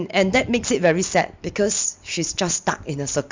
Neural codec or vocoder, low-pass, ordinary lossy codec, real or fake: none; 7.2 kHz; MP3, 64 kbps; real